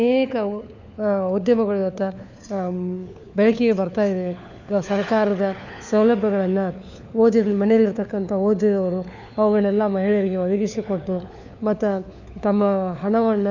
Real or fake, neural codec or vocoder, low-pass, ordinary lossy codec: fake; codec, 16 kHz, 4 kbps, FunCodec, trained on LibriTTS, 50 frames a second; 7.2 kHz; none